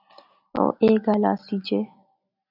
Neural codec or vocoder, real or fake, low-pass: none; real; 5.4 kHz